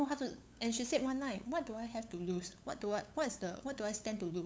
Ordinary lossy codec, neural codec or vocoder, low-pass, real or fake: none; codec, 16 kHz, 8 kbps, FunCodec, trained on LibriTTS, 25 frames a second; none; fake